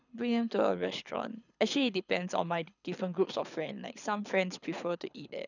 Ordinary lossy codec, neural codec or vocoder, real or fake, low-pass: none; codec, 24 kHz, 6 kbps, HILCodec; fake; 7.2 kHz